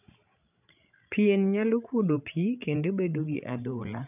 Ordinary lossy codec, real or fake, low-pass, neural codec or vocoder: none; fake; 3.6 kHz; codec, 16 kHz, 16 kbps, FreqCodec, larger model